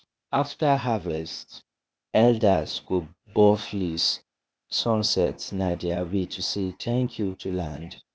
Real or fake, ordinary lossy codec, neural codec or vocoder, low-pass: fake; none; codec, 16 kHz, 0.8 kbps, ZipCodec; none